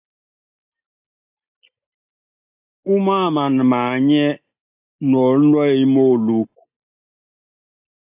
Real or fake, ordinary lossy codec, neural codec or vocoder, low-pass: real; AAC, 32 kbps; none; 3.6 kHz